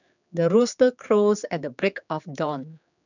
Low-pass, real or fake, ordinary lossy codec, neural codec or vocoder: 7.2 kHz; fake; none; codec, 16 kHz, 4 kbps, X-Codec, HuBERT features, trained on general audio